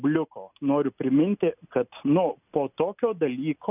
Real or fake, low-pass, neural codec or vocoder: real; 3.6 kHz; none